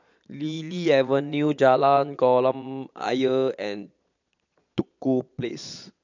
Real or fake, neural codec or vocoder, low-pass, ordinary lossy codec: fake; vocoder, 22.05 kHz, 80 mel bands, Vocos; 7.2 kHz; none